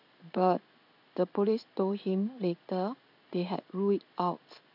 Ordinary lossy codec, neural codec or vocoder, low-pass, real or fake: none; codec, 16 kHz in and 24 kHz out, 1 kbps, XY-Tokenizer; 5.4 kHz; fake